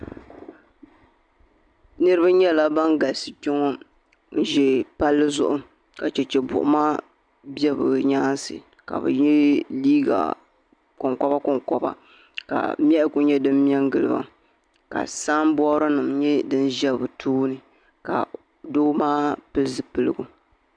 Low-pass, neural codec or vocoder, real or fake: 9.9 kHz; none; real